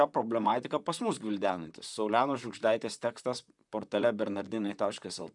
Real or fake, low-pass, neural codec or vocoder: fake; 10.8 kHz; vocoder, 44.1 kHz, 128 mel bands, Pupu-Vocoder